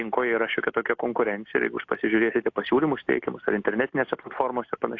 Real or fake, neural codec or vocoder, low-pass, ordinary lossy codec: real; none; 7.2 kHz; AAC, 48 kbps